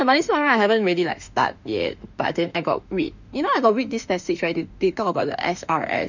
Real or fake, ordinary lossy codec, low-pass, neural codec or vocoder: fake; none; 7.2 kHz; autoencoder, 48 kHz, 32 numbers a frame, DAC-VAE, trained on Japanese speech